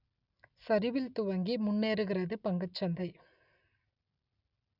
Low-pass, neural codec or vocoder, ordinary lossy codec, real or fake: 5.4 kHz; none; none; real